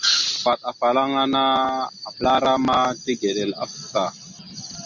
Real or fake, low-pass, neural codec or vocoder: real; 7.2 kHz; none